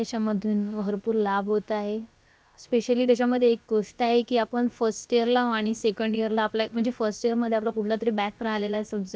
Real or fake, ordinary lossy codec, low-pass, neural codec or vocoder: fake; none; none; codec, 16 kHz, about 1 kbps, DyCAST, with the encoder's durations